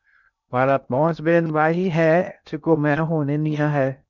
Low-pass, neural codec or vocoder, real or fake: 7.2 kHz; codec, 16 kHz in and 24 kHz out, 0.6 kbps, FocalCodec, streaming, 2048 codes; fake